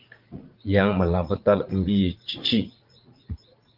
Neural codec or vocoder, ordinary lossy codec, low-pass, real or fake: vocoder, 44.1 kHz, 80 mel bands, Vocos; Opus, 32 kbps; 5.4 kHz; fake